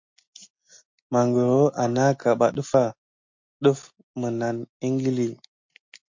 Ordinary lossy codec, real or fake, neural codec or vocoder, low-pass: MP3, 48 kbps; real; none; 7.2 kHz